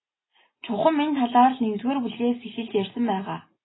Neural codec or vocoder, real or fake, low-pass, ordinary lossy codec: none; real; 7.2 kHz; AAC, 16 kbps